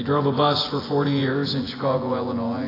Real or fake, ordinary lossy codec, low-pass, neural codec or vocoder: fake; AAC, 24 kbps; 5.4 kHz; vocoder, 24 kHz, 100 mel bands, Vocos